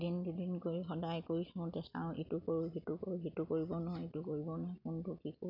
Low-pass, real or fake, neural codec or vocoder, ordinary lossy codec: 5.4 kHz; real; none; none